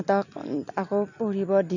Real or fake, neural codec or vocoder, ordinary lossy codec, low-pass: real; none; none; 7.2 kHz